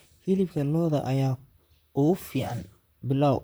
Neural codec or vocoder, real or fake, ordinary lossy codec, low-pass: vocoder, 44.1 kHz, 128 mel bands, Pupu-Vocoder; fake; none; none